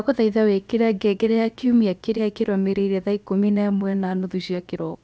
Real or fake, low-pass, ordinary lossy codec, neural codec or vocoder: fake; none; none; codec, 16 kHz, about 1 kbps, DyCAST, with the encoder's durations